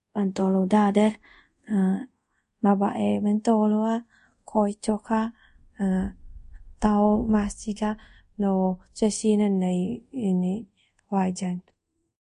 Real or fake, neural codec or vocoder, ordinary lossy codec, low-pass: fake; codec, 24 kHz, 0.5 kbps, DualCodec; MP3, 48 kbps; 10.8 kHz